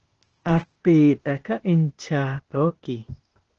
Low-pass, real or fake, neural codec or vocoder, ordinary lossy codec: 7.2 kHz; fake; codec, 16 kHz, 0.8 kbps, ZipCodec; Opus, 16 kbps